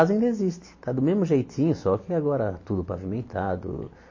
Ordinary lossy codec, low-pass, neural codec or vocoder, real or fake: MP3, 32 kbps; 7.2 kHz; none; real